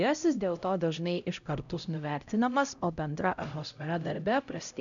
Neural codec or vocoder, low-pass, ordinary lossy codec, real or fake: codec, 16 kHz, 0.5 kbps, X-Codec, HuBERT features, trained on LibriSpeech; 7.2 kHz; MP3, 96 kbps; fake